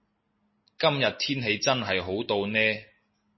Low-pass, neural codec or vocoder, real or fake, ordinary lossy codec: 7.2 kHz; none; real; MP3, 24 kbps